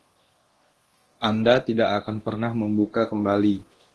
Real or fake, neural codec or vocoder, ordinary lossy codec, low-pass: fake; codec, 24 kHz, 0.9 kbps, DualCodec; Opus, 16 kbps; 10.8 kHz